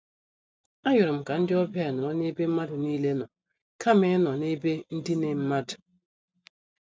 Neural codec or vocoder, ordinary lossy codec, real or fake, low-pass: none; none; real; none